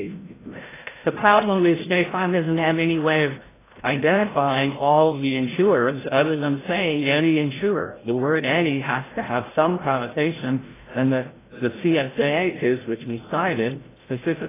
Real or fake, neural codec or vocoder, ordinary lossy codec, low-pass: fake; codec, 16 kHz, 0.5 kbps, FreqCodec, larger model; AAC, 16 kbps; 3.6 kHz